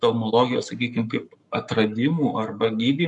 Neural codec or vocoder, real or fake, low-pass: codec, 44.1 kHz, 7.8 kbps, Pupu-Codec; fake; 10.8 kHz